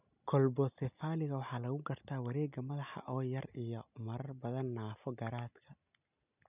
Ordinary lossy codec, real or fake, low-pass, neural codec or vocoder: none; real; 3.6 kHz; none